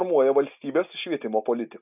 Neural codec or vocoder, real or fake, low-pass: none; real; 3.6 kHz